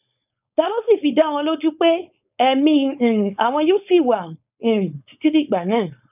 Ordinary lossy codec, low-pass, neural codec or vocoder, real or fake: none; 3.6 kHz; codec, 16 kHz, 4.8 kbps, FACodec; fake